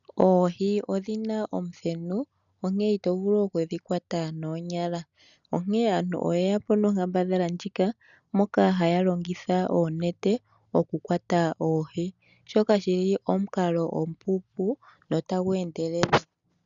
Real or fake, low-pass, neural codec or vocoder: real; 7.2 kHz; none